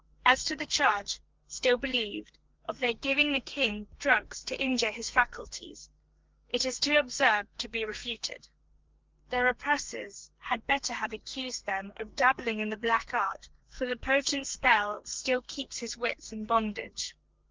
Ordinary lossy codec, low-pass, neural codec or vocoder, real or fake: Opus, 32 kbps; 7.2 kHz; codec, 32 kHz, 1.9 kbps, SNAC; fake